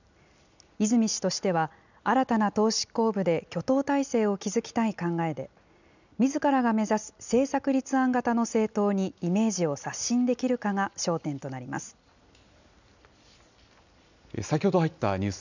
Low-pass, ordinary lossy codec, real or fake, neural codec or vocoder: 7.2 kHz; none; real; none